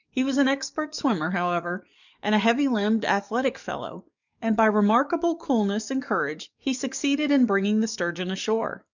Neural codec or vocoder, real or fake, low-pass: codec, 44.1 kHz, 7.8 kbps, DAC; fake; 7.2 kHz